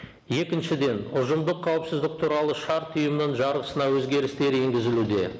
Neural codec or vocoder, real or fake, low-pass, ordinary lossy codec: none; real; none; none